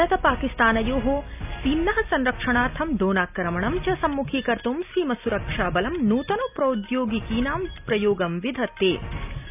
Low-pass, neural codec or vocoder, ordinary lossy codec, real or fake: 3.6 kHz; none; none; real